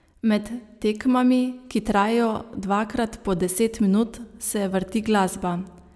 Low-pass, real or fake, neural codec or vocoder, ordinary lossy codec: none; real; none; none